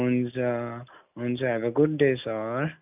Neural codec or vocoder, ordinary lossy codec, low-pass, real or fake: none; none; 3.6 kHz; real